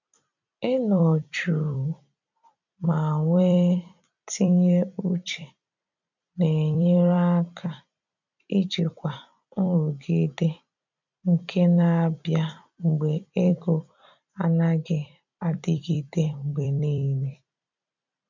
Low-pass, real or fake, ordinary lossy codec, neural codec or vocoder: 7.2 kHz; fake; none; vocoder, 44.1 kHz, 128 mel bands every 256 samples, BigVGAN v2